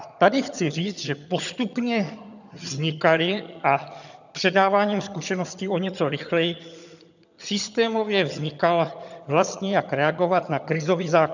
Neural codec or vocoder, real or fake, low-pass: vocoder, 22.05 kHz, 80 mel bands, HiFi-GAN; fake; 7.2 kHz